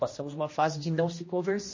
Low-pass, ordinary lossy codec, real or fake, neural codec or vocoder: 7.2 kHz; MP3, 32 kbps; fake; codec, 16 kHz, 1 kbps, X-Codec, HuBERT features, trained on general audio